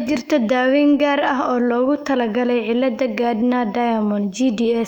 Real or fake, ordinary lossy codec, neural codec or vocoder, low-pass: fake; none; autoencoder, 48 kHz, 128 numbers a frame, DAC-VAE, trained on Japanese speech; 19.8 kHz